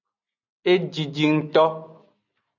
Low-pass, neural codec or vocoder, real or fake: 7.2 kHz; none; real